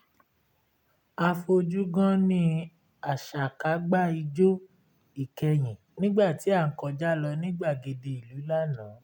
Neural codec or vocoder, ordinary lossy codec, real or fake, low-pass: none; none; real; 19.8 kHz